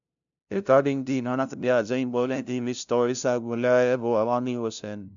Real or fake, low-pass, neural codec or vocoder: fake; 7.2 kHz; codec, 16 kHz, 0.5 kbps, FunCodec, trained on LibriTTS, 25 frames a second